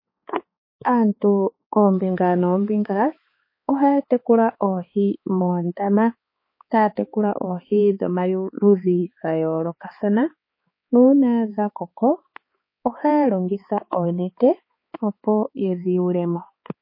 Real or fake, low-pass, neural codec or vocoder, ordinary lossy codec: fake; 5.4 kHz; codec, 16 kHz, 4 kbps, X-Codec, HuBERT features, trained on balanced general audio; MP3, 24 kbps